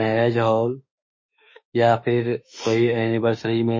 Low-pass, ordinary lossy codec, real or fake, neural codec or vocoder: 7.2 kHz; MP3, 32 kbps; fake; autoencoder, 48 kHz, 32 numbers a frame, DAC-VAE, trained on Japanese speech